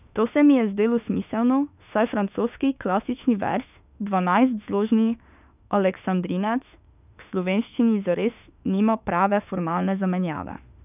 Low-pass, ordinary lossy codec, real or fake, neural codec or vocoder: 3.6 kHz; none; fake; autoencoder, 48 kHz, 32 numbers a frame, DAC-VAE, trained on Japanese speech